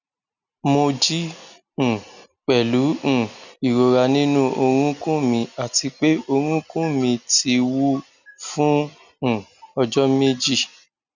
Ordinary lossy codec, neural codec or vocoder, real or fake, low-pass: none; none; real; 7.2 kHz